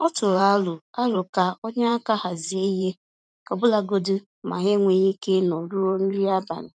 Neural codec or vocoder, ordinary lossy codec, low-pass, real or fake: vocoder, 44.1 kHz, 128 mel bands every 512 samples, BigVGAN v2; none; 9.9 kHz; fake